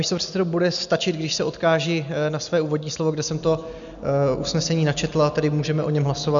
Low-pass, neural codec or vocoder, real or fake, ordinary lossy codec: 7.2 kHz; none; real; MP3, 96 kbps